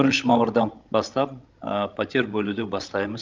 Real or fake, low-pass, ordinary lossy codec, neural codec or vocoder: fake; 7.2 kHz; Opus, 24 kbps; codec, 16 kHz, 16 kbps, FreqCodec, larger model